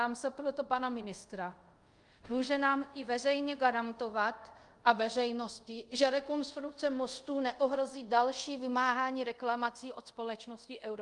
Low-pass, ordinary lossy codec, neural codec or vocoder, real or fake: 10.8 kHz; Opus, 32 kbps; codec, 24 kHz, 0.5 kbps, DualCodec; fake